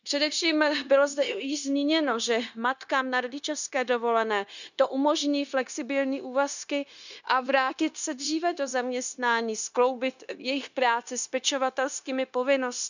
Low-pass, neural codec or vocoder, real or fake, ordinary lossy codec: 7.2 kHz; codec, 16 kHz, 0.9 kbps, LongCat-Audio-Codec; fake; none